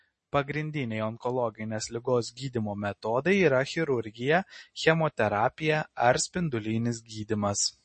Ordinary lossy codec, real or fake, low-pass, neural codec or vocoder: MP3, 32 kbps; real; 9.9 kHz; none